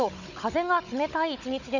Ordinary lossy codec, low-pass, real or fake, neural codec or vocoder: none; 7.2 kHz; fake; codec, 16 kHz, 16 kbps, FunCodec, trained on Chinese and English, 50 frames a second